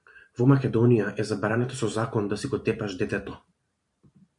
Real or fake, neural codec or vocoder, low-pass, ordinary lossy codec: fake; vocoder, 44.1 kHz, 128 mel bands every 512 samples, BigVGAN v2; 10.8 kHz; MP3, 96 kbps